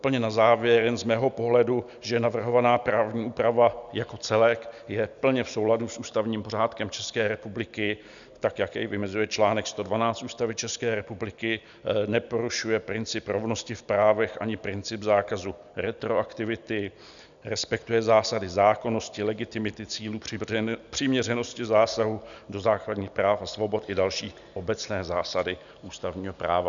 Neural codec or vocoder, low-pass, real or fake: none; 7.2 kHz; real